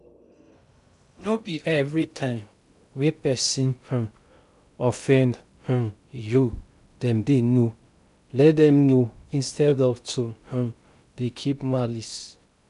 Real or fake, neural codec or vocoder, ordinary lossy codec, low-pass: fake; codec, 16 kHz in and 24 kHz out, 0.6 kbps, FocalCodec, streaming, 2048 codes; none; 10.8 kHz